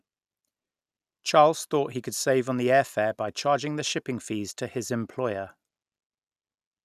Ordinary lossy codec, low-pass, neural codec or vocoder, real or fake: none; 14.4 kHz; none; real